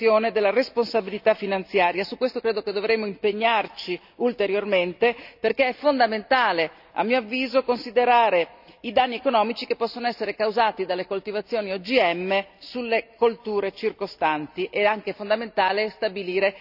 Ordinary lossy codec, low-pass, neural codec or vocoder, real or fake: none; 5.4 kHz; none; real